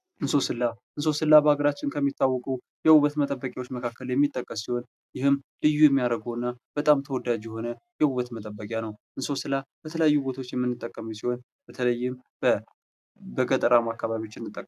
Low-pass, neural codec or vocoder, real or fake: 14.4 kHz; none; real